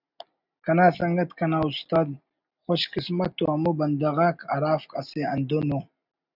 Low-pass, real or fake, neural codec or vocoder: 5.4 kHz; real; none